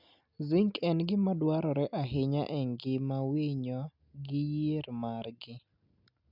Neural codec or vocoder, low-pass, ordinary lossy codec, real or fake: none; 5.4 kHz; none; real